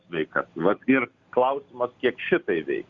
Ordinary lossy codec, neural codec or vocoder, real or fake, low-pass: Opus, 64 kbps; none; real; 7.2 kHz